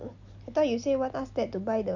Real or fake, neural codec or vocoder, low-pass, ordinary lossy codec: real; none; 7.2 kHz; none